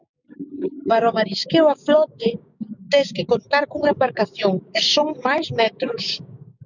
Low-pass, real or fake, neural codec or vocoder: 7.2 kHz; fake; codec, 16 kHz, 6 kbps, DAC